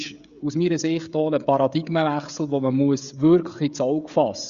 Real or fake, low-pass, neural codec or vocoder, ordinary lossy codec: fake; 7.2 kHz; codec, 16 kHz, 16 kbps, FreqCodec, smaller model; Opus, 64 kbps